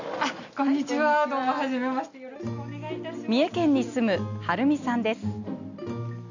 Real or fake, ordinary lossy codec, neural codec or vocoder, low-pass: real; none; none; 7.2 kHz